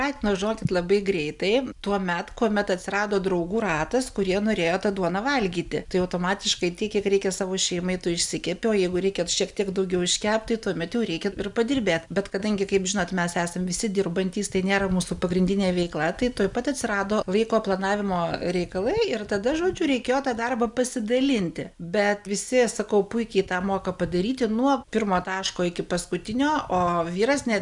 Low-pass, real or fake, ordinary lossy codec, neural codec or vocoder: 10.8 kHz; real; MP3, 96 kbps; none